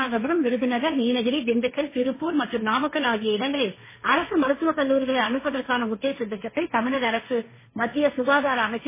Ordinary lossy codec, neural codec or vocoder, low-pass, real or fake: MP3, 16 kbps; codec, 16 kHz, 1.1 kbps, Voila-Tokenizer; 3.6 kHz; fake